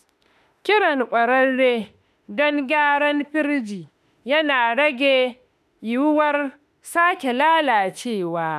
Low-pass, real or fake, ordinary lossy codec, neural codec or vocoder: 14.4 kHz; fake; none; autoencoder, 48 kHz, 32 numbers a frame, DAC-VAE, trained on Japanese speech